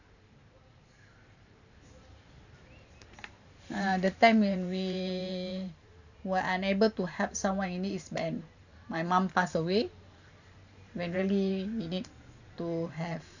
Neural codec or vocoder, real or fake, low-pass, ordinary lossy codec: vocoder, 44.1 kHz, 128 mel bands every 512 samples, BigVGAN v2; fake; 7.2 kHz; none